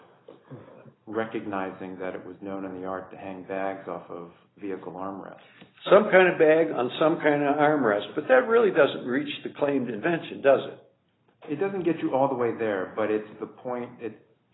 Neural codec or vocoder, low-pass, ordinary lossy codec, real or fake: none; 7.2 kHz; AAC, 16 kbps; real